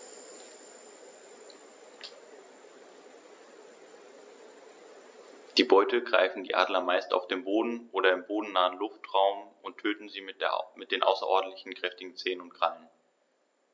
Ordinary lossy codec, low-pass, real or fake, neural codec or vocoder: none; none; real; none